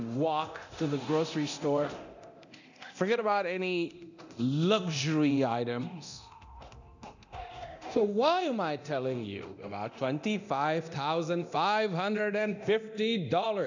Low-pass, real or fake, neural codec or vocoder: 7.2 kHz; fake; codec, 24 kHz, 0.9 kbps, DualCodec